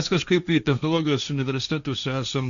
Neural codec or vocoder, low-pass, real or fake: codec, 16 kHz, 1.1 kbps, Voila-Tokenizer; 7.2 kHz; fake